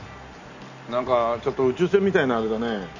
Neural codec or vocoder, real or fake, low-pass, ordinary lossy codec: none; real; 7.2 kHz; none